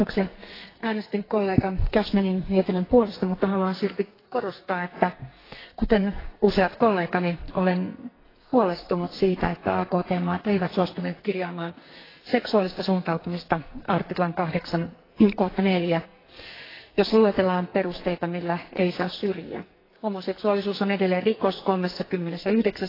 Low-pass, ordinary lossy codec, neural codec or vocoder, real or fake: 5.4 kHz; AAC, 24 kbps; codec, 44.1 kHz, 2.6 kbps, SNAC; fake